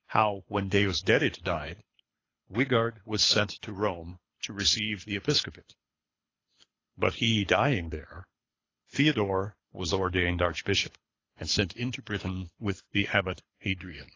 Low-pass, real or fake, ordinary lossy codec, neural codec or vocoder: 7.2 kHz; fake; AAC, 32 kbps; codec, 24 kHz, 6 kbps, HILCodec